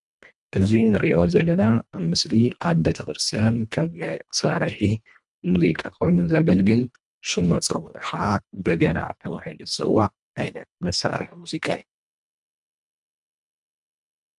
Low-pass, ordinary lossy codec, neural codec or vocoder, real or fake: 10.8 kHz; MP3, 96 kbps; codec, 24 kHz, 1.5 kbps, HILCodec; fake